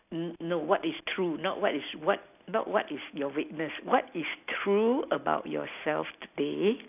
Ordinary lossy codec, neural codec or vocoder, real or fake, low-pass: none; none; real; 3.6 kHz